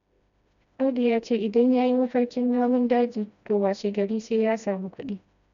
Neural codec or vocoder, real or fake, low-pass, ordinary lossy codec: codec, 16 kHz, 1 kbps, FreqCodec, smaller model; fake; 7.2 kHz; none